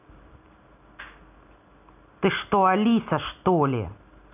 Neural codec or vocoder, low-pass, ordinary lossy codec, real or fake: none; 3.6 kHz; none; real